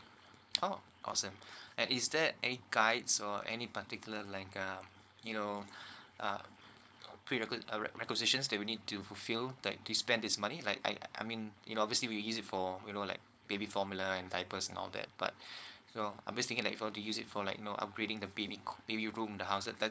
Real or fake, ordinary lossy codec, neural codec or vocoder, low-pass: fake; none; codec, 16 kHz, 4.8 kbps, FACodec; none